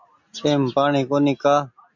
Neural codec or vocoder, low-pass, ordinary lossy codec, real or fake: none; 7.2 kHz; MP3, 64 kbps; real